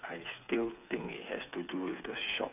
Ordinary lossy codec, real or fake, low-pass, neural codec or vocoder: none; fake; 3.6 kHz; codec, 16 kHz, 8 kbps, FreqCodec, smaller model